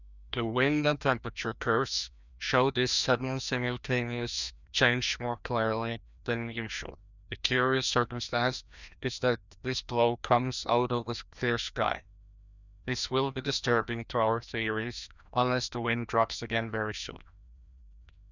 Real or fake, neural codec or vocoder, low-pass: fake; codec, 16 kHz, 1 kbps, FreqCodec, larger model; 7.2 kHz